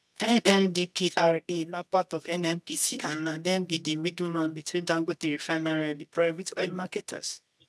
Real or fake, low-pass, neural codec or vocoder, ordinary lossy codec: fake; none; codec, 24 kHz, 0.9 kbps, WavTokenizer, medium music audio release; none